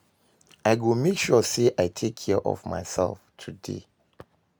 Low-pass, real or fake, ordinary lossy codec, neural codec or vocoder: none; real; none; none